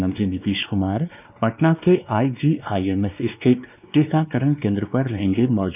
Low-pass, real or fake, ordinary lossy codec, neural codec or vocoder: 3.6 kHz; fake; none; codec, 16 kHz, 2 kbps, X-Codec, WavLM features, trained on Multilingual LibriSpeech